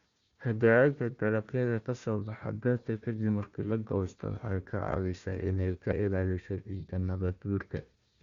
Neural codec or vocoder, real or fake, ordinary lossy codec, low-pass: codec, 16 kHz, 1 kbps, FunCodec, trained on Chinese and English, 50 frames a second; fake; Opus, 64 kbps; 7.2 kHz